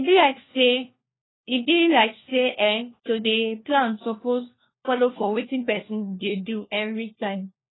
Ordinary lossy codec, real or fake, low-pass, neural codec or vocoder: AAC, 16 kbps; fake; 7.2 kHz; codec, 16 kHz, 1 kbps, FunCodec, trained on LibriTTS, 50 frames a second